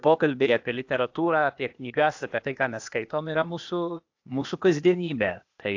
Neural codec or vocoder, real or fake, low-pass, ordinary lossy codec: codec, 16 kHz, 0.8 kbps, ZipCodec; fake; 7.2 kHz; AAC, 48 kbps